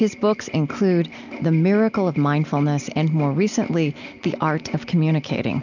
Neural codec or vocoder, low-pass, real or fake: none; 7.2 kHz; real